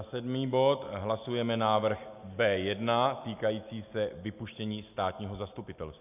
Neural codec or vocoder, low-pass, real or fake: none; 3.6 kHz; real